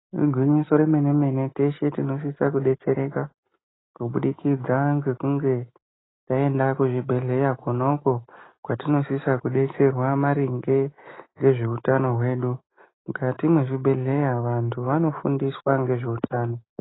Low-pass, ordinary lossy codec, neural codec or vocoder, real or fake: 7.2 kHz; AAC, 16 kbps; none; real